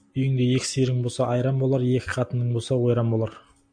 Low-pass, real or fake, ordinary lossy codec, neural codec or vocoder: 9.9 kHz; real; AAC, 64 kbps; none